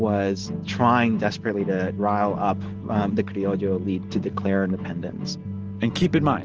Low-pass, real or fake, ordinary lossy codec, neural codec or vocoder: 7.2 kHz; real; Opus, 16 kbps; none